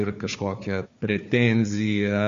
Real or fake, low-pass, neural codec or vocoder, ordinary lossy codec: fake; 7.2 kHz; codec, 16 kHz, 4 kbps, FunCodec, trained on Chinese and English, 50 frames a second; MP3, 48 kbps